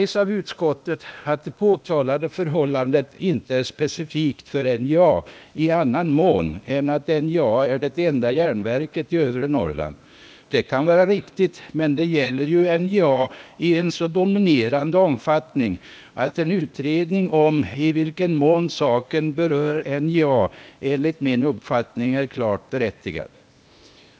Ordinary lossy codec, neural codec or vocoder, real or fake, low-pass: none; codec, 16 kHz, 0.8 kbps, ZipCodec; fake; none